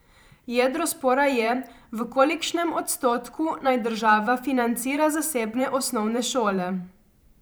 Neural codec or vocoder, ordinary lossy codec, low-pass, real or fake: vocoder, 44.1 kHz, 128 mel bands every 512 samples, BigVGAN v2; none; none; fake